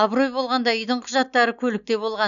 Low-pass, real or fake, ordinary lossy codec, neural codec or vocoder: 7.2 kHz; real; none; none